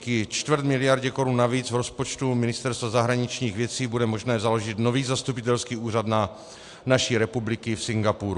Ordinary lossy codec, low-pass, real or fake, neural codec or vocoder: AAC, 64 kbps; 10.8 kHz; real; none